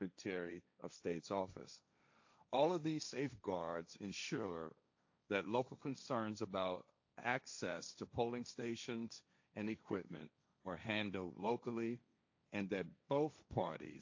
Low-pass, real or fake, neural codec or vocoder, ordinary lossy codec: 7.2 kHz; fake; codec, 16 kHz, 1.1 kbps, Voila-Tokenizer; AAC, 48 kbps